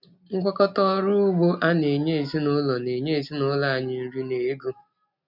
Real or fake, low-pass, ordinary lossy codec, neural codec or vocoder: real; 5.4 kHz; none; none